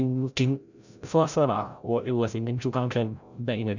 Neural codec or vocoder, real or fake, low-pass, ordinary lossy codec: codec, 16 kHz, 0.5 kbps, FreqCodec, larger model; fake; 7.2 kHz; none